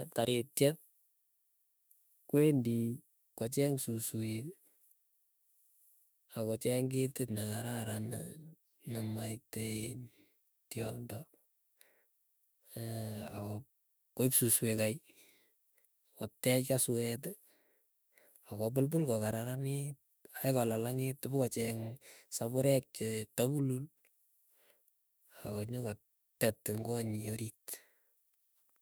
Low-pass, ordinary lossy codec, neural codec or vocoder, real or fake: none; none; autoencoder, 48 kHz, 32 numbers a frame, DAC-VAE, trained on Japanese speech; fake